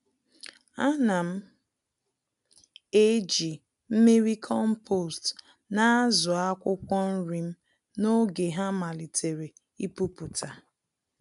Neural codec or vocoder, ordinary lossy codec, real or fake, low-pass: none; none; real; 10.8 kHz